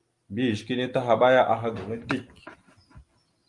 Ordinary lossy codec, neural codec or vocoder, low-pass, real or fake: Opus, 32 kbps; none; 10.8 kHz; real